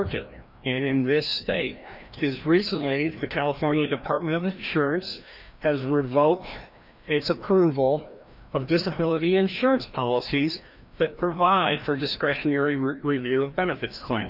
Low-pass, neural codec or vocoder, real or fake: 5.4 kHz; codec, 16 kHz, 1 kbps, FreqCodec, larger model; fake